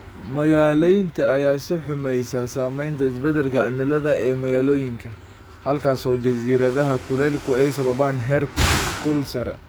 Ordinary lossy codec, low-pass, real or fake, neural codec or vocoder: none; none; fake; codec, 44.1 kHz, 2.6 kbps, SNAC